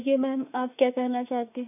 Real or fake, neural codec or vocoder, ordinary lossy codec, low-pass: fake; autoencoder, 48 kHz, 32 numbers a frame, DAC-VAE, trained on Japanese speech; none; 3.6 kHz